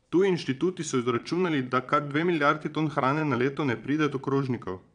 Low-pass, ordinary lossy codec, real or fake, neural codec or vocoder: 9.9 kHz; none; fake; vocoder, 22.05 kHz, 80 mel bands, Vocos